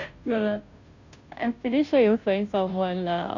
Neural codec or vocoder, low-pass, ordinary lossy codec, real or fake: codec, 16 kHz, 0.5 kbps, FunCodec, trained on Chinese and English, 25 frames a second; 7.2 kHz; none; fake